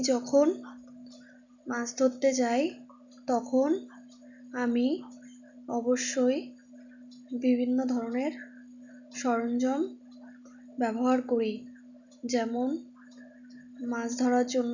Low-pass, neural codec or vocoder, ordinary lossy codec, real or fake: 7.2 kHz; none; none; real